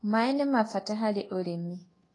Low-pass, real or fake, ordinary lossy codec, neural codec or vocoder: 10.8 kHz; fake; AAC, 32 kbps; codec, 24 kHz, 1.2 kbps, DualCodec